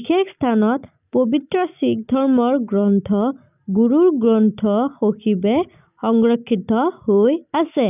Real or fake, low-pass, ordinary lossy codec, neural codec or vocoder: real; 3.6 kHz; none; none